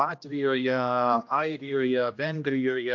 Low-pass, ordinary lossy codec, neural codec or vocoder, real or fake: 7.2 kHz; MP3, 64 kbps; codec, 16 kHz, 1 kbps, X-Codec, HuBERT features, trained on general audio; fake